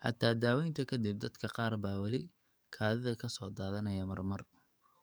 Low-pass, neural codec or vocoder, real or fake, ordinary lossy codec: none; codec, 44.1 kHz, 7.8 kbps, DAC; fake; none